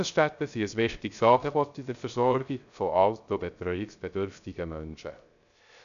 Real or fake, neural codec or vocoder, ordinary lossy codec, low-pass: fake; codec, 16 kHz, 0.3 kbps, FocalCodec; none; 7.2 kHz